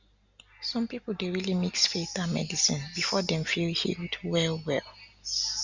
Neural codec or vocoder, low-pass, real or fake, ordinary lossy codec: none; 7.2 kHz; real; Opus, 64 kbps